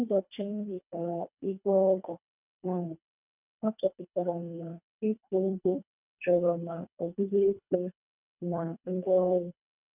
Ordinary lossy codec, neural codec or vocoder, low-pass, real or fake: none; codec, 24 kHz, 1.5 kbps, HILCodec; 3.6 kHz; fake